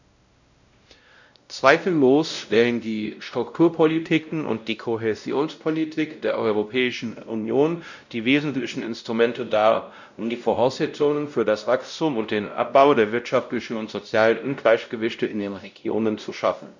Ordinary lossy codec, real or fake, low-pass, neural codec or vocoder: none; fake; 7.2 kHz; codec, 16 kHz, 0.5 kbps, X-Codec, WavLM features, trained on Multilingual LibriSpeech